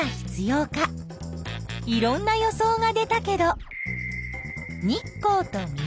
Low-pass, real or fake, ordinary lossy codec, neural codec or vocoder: none; real; none; none